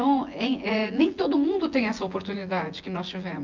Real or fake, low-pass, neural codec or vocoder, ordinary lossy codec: fake; 7.2 kHz; vocoder, 24 kHz, 100 mel bands, Vocos; Opus, 32 kbps